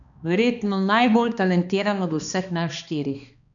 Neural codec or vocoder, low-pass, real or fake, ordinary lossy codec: codec, 16 kHz, 2 kbps, X-Codec, HuBERT features, trained on balanced general audio; 7.2 kHz; fake; none